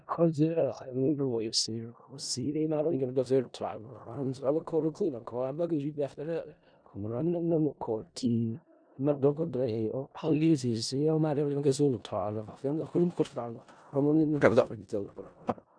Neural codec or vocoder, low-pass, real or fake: codec, 16 kHz in and 24 kHz out, 0.4 kbps, LongCat-Audio-Codec, four codebook decoder; 9.9 kHz; fake